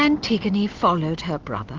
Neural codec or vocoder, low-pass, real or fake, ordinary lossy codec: none; 7.2 kHz; real; Opus, 16 kbps